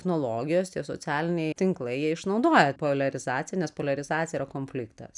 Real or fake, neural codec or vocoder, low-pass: real; none; 10.8 kHz